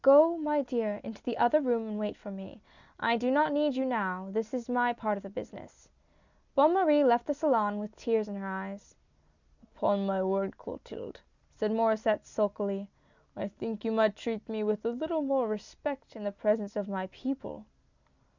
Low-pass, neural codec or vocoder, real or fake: 7.2 kHz; none; real